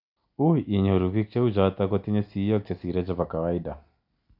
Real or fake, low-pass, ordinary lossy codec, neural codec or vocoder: real; 5.4 kHz; none; none